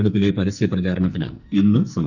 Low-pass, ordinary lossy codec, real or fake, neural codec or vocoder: 7.2 kHz; none; fake; codec, 44.1 kHz, 2.6 kbps, SNAC